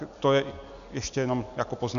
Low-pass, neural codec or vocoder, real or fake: 7.2 kHz; none; real